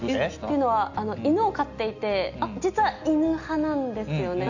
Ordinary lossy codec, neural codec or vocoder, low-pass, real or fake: none; none; 7.2 kHz; real